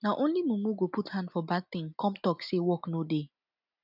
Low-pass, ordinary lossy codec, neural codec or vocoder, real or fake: 5.4 kHz; none; none; real